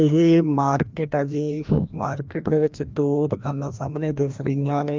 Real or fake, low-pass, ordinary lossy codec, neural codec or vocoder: fake; 7.2 kHz; Opus, 24 kbps; codec, 16 kHz, 1 kbps, FreqCodec, larger model